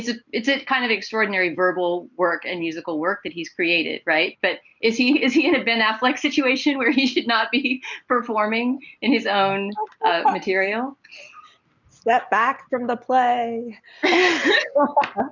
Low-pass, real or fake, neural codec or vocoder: 7.2 kHz; real; none